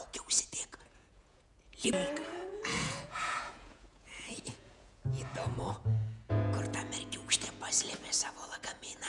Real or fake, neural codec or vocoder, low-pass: fake; vocoder, 48 kHz, 128 mel bands, Vocos; 10.8 kHz